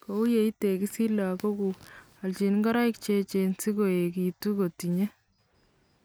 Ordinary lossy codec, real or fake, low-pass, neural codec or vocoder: none; real; none; none